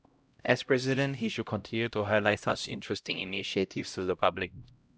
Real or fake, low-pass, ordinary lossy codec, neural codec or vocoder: fake; none; none; codec, 16 kHz, 0.5 kbps, X-Codec, HuBERT features, trained on LibriSpeech